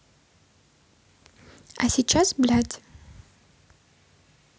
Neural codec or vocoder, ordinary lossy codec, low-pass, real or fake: none; none; none; real